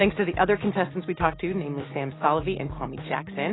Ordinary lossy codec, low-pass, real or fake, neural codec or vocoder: AAC, 16 kbps; 7.2 kHz; real; none